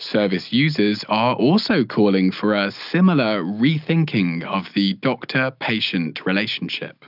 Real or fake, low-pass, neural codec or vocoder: real; 5.4 kHz; none